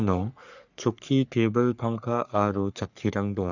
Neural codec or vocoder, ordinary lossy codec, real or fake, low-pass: codec, 44.1 kHz, 3.4 kbps, Pupu-Codec; none; fake; 7.2 kHz